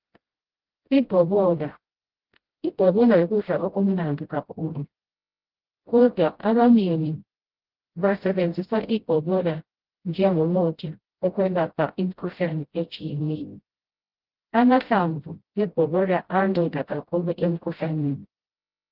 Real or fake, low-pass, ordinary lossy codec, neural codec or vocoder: fake; 5.4 kHz; Opus, 16 kbps; codec, 16 kHz, 0.5 kbps, FreqCodec, smaller model